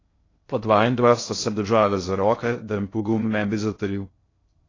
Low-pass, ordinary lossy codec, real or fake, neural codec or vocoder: 7.2 kHz; AAC, 32 kbps; fake; codec, 16 kHz in and 24 kHz out, 0.6 kbps, FocalCodec, streaming, 2048 codes